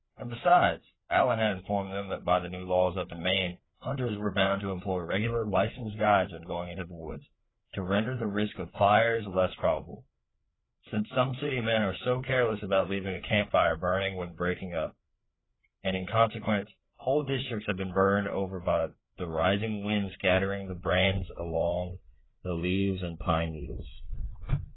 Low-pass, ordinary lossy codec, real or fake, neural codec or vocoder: 7.2 kHz; AAC, 16 kbps; fake; codec, 16 kHz, 4 kbps, FreqCodec, larger model